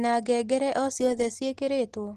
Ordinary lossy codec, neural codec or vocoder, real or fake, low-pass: Opus, 16 kbps; none; real; 14.4 kHz